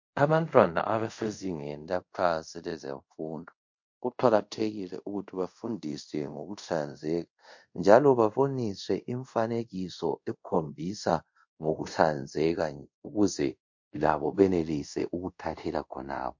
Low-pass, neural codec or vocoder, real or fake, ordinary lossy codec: 7.2 kHz; codec, 24 kHz, 0.5 kbps, DualCodec; fake; MP3, 48 kbps